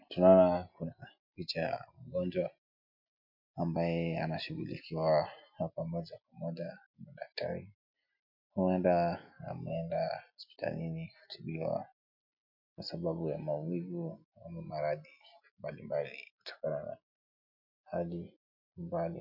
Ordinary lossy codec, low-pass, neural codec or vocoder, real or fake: MP3, 48 kbps; 5.4 kHz; none; real